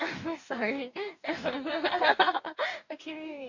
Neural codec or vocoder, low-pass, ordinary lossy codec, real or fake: codec, 44.1 kHz, 2.6 kbps, DAC; 7.2 kHz; none; fake